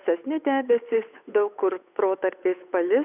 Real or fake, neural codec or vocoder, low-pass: fake; codec, 16 kHz, 8 kbps, FunCodec, trained on Chinese and English, 25 frames a second; 3.6 kHz